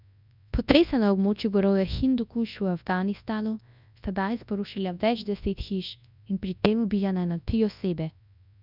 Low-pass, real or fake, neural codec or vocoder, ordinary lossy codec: 5.4 kHz; fake; codec, 24 kHz, 0.9 kbps, WavTokenizer, large speech release; none